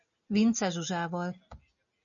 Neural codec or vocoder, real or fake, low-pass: none; real; 7.2 kHz